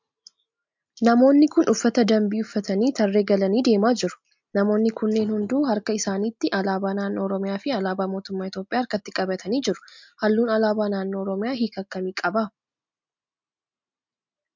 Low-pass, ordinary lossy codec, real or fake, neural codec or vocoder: 7.2 kHz; MP3, 64 kbps; real; none